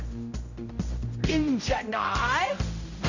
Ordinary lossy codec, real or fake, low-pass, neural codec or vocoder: none; fake; 7.2 kHz; codec, 16 kHz, 0.5 kbps, X-Codec, HuBERT features, trained on general audio